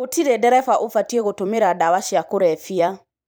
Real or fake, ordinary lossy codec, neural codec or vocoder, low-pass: fake; none; vocoder, 44.1 kHz, 128 mel bands every 256 samples, BigVGAN v2; none